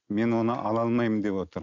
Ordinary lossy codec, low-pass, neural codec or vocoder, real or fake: none; 7.2 kHz; none; real